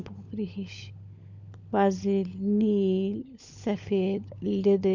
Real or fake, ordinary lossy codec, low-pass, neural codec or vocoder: fake; MP3, 64 kbps; 7.2 kHz; codec, 16 kHz, 16 kbps, FunCodec, trained on LibriTTS, 50 frames a second